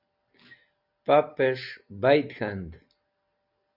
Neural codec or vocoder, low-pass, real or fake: none; 5.4 kHz; real